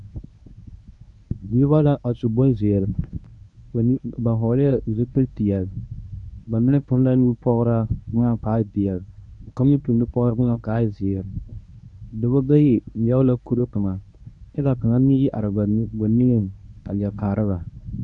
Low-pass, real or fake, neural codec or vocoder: 10.8 kHz; fake; codec, 24 kHz, 0.9 kbps, WavTokenizer, medium speech release version 1